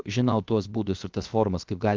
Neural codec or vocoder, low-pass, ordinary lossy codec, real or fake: codec, 16 kHz, 0.7 kbps, FocalCodec; 7.2 kHz; Opus, 24 kbps; fake